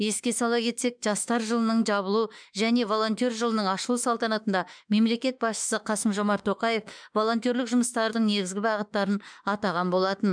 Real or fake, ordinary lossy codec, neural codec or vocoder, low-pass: fake; none; autoencoder, 48 kHz, 32 numbers a frame, DAC-VAE, trained on Japanese speech; 9.9 kHz